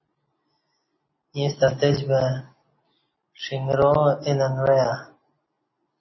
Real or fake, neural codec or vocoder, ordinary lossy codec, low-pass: real; none; MP3, 24 kbps; 7.2 kHz